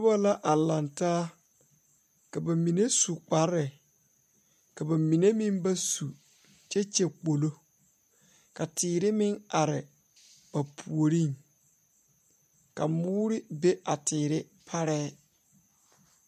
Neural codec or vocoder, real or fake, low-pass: none; real; 14.4 kHz